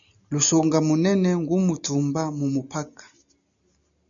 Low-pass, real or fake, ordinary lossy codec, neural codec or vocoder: 7.2 kHz; real; MP3, 96 kbps; none